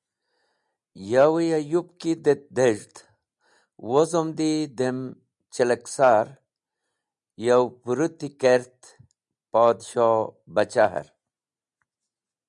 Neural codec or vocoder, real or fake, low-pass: none; real; 10.8 kHz